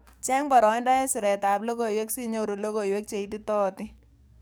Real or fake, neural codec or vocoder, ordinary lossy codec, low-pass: fake; codec, 44.1 kHz, 7.8 kbps, DAC; none; none